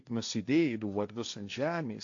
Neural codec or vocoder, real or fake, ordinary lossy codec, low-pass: codec, 16 kHz, 1.1 kbps, Voila-Tokenizer; fake; AAC, 64 kbps; 7.2 kHz